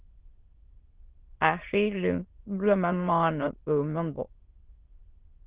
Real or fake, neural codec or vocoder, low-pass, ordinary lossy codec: fake; autoencoder, 22.05 kHz, a latent of 192 numbers a frame, VITS, trained on many speakers; 3.6 kHz; Opus, 16 kbps